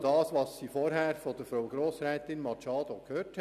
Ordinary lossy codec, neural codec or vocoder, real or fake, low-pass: none; none; real; 14.4 kHz